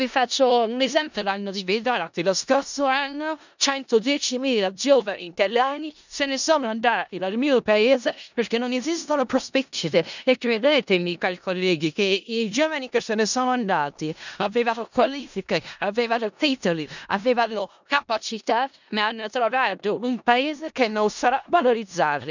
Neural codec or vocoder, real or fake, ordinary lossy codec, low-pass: codec, 16 kHz in and 24 kHz out, 0.4 kbps, LongCat-Audio-Codec, four codebook decoder; fake; none; 7.2 kHz